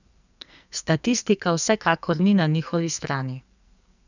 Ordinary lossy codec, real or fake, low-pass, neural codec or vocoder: none; fake; 7.2 kHz; codec, 44.1 kHz, 2.6 kbps, SNAC